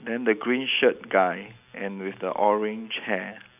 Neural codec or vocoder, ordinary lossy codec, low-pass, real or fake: none; none; 3.6 kHz; real